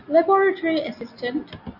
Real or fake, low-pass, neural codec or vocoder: real; 5.4 kHz; none